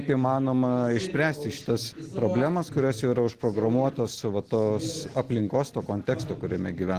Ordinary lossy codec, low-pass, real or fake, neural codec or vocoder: Opus, 16 kbps; 14.4 kHz; real; none